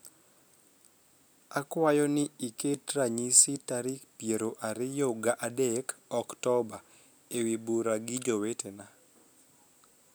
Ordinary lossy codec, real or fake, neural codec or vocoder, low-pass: none; real; none; none